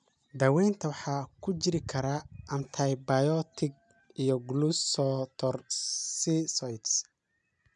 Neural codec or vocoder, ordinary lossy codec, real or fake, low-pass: none; none; real; 9.9 kHz